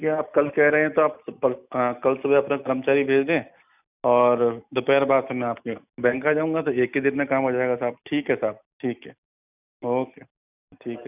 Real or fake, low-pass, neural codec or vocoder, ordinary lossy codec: real; 3.6 kHz; none; none